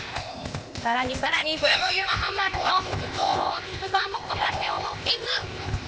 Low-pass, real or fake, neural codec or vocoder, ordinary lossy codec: none; fake; codec, 16 kHz, 0.8 kbps, ZipCodec; none